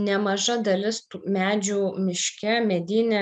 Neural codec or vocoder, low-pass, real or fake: none; 9.9 kHz; real